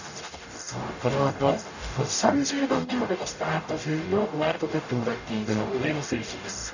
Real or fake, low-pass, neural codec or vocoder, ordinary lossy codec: fake; 7.2 kHz; codec, 44.1 kHz, 0.9 kbps, DAC; none